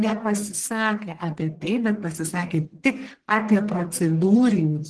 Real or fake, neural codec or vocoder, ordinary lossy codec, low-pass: fake; codec, 44.1 kHz, 1.7 kbps, Pupu-Codec; Opus, 16 kbps; 10.8 kHz